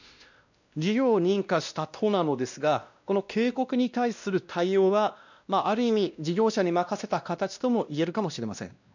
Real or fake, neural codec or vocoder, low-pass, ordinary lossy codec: fake; codec, 16 kHz, 1 kbps, X-Codec, WavLM features, trained on Multilingual LibriSpeech; 7.2 kHz; none